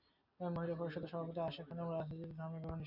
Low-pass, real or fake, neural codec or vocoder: 5.4 kHz; real; none